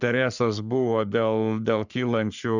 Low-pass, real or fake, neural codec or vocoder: 7.2 kHz; fake; codec, 44.1 kHz, 3.4 kbps, Pupu-Codec